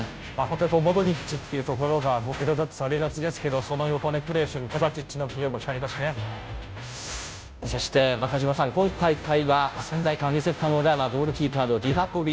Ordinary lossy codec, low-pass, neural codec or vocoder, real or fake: none; none; codec, 16 kHz, 0.5 kbps, FunCodec, trained on Chinese and English, 25 frames a second; fake